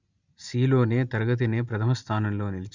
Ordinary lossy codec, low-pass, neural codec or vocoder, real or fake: Opus, 64 kbps; 7.2 kHz; none; real